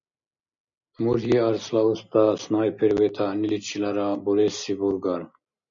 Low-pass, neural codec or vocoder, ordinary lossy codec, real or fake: 7.2 kHz; none; MP3, 64 kbps; real